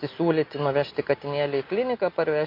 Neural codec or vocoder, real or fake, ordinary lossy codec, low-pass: none; real; MP3, 32 kbps; 5.4 kHz